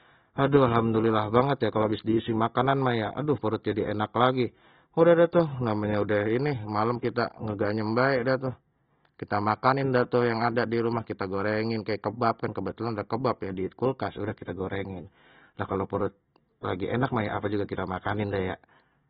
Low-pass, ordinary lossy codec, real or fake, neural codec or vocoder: 14.4 kHz; AAC, 16 kbps; real; none